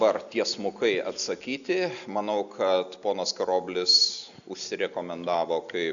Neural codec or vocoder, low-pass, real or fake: none; 7.2 kHz; real